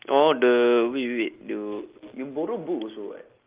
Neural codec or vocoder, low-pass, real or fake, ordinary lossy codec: none; 3.6 kHz; real; Opus, 32 kbps